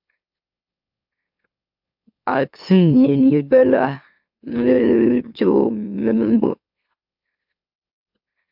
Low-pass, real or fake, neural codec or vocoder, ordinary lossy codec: 5.4 kHz; fake; autoencoder, 44.1 kHz, a latent of 192 numbers a frame, MeloTTS; AAC, 48 kbps